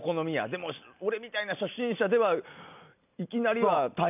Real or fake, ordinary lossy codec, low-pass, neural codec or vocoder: real; none; 3.6 kHz; none